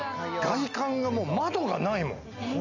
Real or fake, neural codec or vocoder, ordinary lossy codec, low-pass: real; none; none; 7.2 kHz